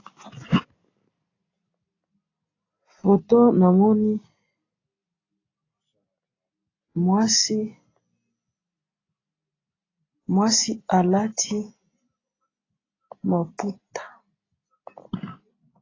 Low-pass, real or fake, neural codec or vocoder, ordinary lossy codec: 7.2 kHz; real; none; AAC, 32 kbps